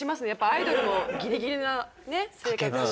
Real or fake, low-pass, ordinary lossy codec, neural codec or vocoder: real; none; none; none